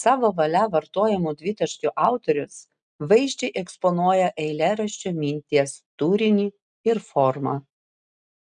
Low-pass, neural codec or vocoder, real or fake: 9.9 kHz; none; real